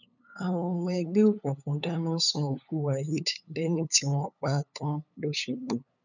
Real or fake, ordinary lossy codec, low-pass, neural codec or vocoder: fake; none; 7.2 kHz; codec, 16 kHz, 8 kbps, FunCodec, trained on LibriTTS, 25 frames a second